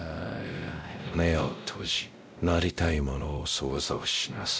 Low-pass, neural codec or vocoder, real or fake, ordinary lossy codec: none; codec, 16 kHz, 0.5 kbps, X-Codec, WavLM features, trained on Multilingual LibriSpeech; fake; none